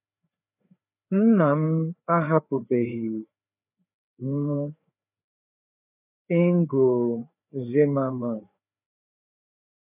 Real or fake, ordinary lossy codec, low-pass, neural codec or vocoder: fake; none; 3.6 kHz; codec, 16 kHz, 4 kbps, FreqCodec, larger model